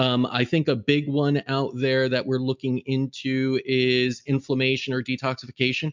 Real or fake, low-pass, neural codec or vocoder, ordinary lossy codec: real; 7.2 kHz; none; MP3, 64 kbps